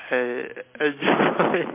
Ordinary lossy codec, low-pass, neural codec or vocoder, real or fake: MP3, 24 kbps; 3.6 kHz; none; real